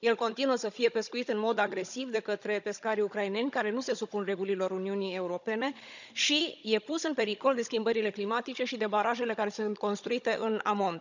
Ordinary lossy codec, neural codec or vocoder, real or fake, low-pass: none; codec, 16 kHz, 16 kbps, FunCodec, trained on Chinese and English, 50 frames a second; fake; 7.2 kHz